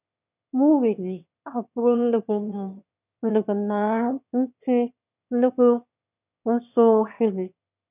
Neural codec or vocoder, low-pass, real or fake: autoencoder, 22.05 kHz, a latent of 192 numbers a frame, VITS, trained on one speaker; 3.6 kHz; fake